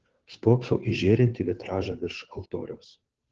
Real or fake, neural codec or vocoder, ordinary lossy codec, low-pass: fake; codec, 16 kHz, 2 kbps, FunCodec, trained on Chinese and English, 25 frames a second; Opus, 16 kbps; 7.2 kHz